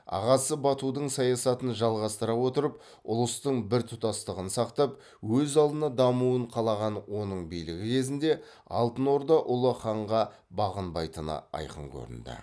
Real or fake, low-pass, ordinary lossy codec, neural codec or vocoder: real; none; none; none